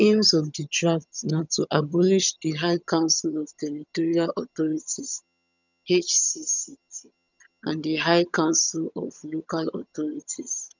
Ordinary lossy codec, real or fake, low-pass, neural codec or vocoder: none; fake; 7.2 kHz; vocoder, 22.05 kHz, 80 mel bands, HiFi-GAN